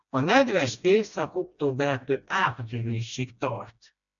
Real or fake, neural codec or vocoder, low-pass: fake; codec, 16 kHz, 1 kbps, FreqCodec, smaller model; 7.2 kHz